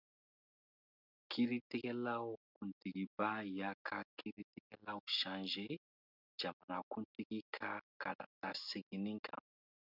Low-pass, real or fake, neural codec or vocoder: 5.4 kHz; real; none